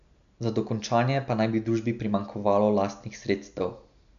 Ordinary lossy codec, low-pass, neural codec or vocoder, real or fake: none; 7.2 kHz; none; real